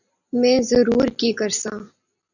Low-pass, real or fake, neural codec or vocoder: 7.2 kHz; real; none